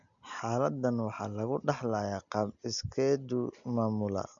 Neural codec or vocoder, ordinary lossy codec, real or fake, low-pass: none; none; real; 7.2 kHz